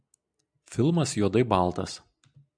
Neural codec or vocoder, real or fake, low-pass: none; real; 9.9 kHz